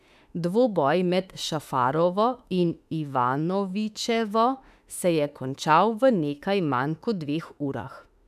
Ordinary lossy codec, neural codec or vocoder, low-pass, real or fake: none; autoencoder, 48 kHz, 32 numbers a frame, DAC-VAE, trained on Japanese speech; 14.4 kHz; fake